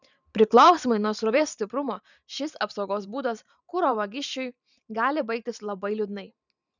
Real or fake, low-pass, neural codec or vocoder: fake; 7.2 kHz; vocoder, 44.1 kHz, 128 mel bands every 512 samples, BigVGAN v2